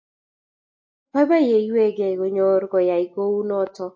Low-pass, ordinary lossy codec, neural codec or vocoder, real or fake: 7.2 kHz; AAC, 48 kbps; none; real